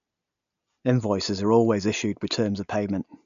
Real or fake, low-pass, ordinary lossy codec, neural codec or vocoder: real; 7.2 kHz; AAC, 96 kbps; none